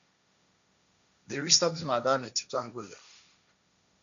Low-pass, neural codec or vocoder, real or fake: 7.2 kHz; codec, 16 kHz, 1.1 kbps, Voila-Tokenizer; fake